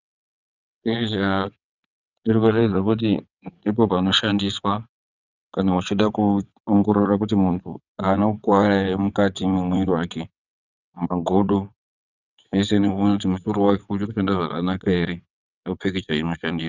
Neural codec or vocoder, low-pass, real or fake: vocoder, 22.05 kHz, 80 mel bands, WaveNeXt; 7.2 kHz; fake